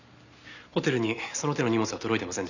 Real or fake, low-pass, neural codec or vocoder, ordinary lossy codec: real; 7.2 kHz; none; none